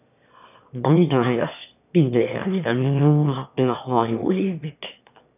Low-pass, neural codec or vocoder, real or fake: 3.6 kHz; autoencoder, 22.05 kHz, a latent of 192 numbers a frame, VITS, trained on one speaker; fake